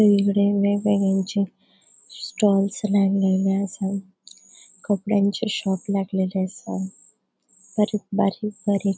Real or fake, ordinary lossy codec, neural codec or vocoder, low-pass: real; none; none; none